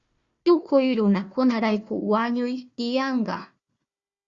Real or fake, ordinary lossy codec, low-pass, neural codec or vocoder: fake; Opus, 64 kbps; 7.2 kHz; codec, 16 kHz, 1 kbps, FunCodec, trained on Chinese and English, 50 frames a second